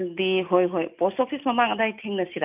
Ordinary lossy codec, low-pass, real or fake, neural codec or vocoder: none; 3.6 kHz; real; none